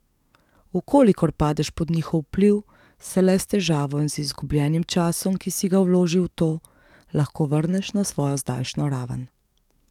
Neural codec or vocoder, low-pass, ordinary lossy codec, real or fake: codec, 44.1 kHz, 7.8 kbps, DAC; 19.8 kHz; none; fake